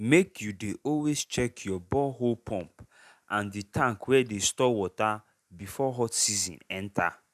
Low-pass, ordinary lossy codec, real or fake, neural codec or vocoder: 14.4 kHz; none; real; none